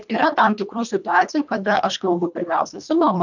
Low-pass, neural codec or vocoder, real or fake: 7.2 kHz; codec, 24 kHz, 1.5 kbps, HILCodec; fake